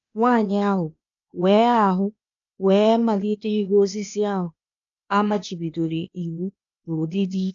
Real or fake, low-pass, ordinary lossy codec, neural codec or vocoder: fake; 7.2 kHz; AAC, 64 kbps; codec, 16 kHz, 0.8 kbps, ZipCodec